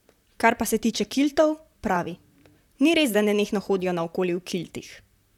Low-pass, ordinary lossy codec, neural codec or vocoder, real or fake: 19.8 kHz; none; vocoder, 44.1 kHz, 128 mel bands, Pupu-Vocoder; fake